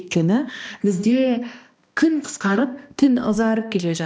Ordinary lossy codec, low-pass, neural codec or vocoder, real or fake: none; none; codec, 16 kHz, 1 kbps, X-Codec, HuBERT features, trained on balanced general audio; fake